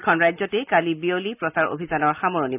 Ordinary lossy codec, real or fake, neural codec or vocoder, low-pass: none; real; none; 3.6 kHz